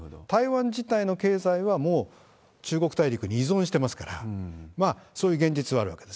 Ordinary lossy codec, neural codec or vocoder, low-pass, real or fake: none; none; none; real